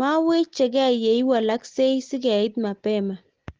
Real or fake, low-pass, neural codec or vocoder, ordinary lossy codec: real; 7.2 kHz; none; Opus, 16 kbps